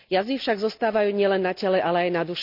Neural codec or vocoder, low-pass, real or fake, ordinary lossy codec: none; 5.4 kHz; real; none